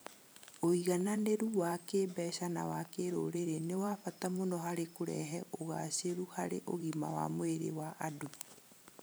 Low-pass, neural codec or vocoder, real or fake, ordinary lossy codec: none; vocoder, 44.1 kHz, 128 mel bands every 512 samples, BigVGAN v2; fake; none